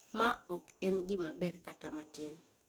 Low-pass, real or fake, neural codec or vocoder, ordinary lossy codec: none; fake; codec, 44.1 kHz, 2.6 kbps, DAC; none